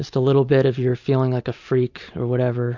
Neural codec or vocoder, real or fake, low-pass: none; real; 7.2 kHz